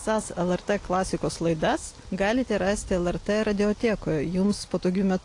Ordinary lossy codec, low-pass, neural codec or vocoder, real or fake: AAC, 48 kbps; 10.8 kHz; none; real